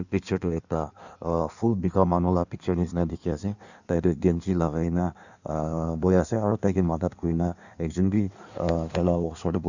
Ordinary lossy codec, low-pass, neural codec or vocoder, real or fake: none; 7.2 kHz; codec, 16 kHz in and 24 kHz out, 1.1 kbps, FireRedTTS-2 codec; fake